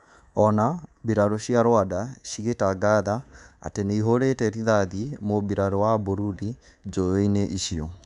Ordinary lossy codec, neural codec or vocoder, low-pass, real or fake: none; codec, 24 kHz, 3.1 kbps, DualCodec; 10.8 kHz; fake